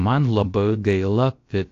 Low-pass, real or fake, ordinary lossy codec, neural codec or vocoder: 7.2 kHz; fake; AAC, 48 kbps; codec, 16 kHz, about 1 kbps, DyCAST, with the encoder's durations